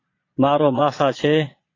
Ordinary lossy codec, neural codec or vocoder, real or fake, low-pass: AAC, 32 kbps; vocoder, 22.05 kHz, 80 mel bands, Vocos; fake; 7.2 kHz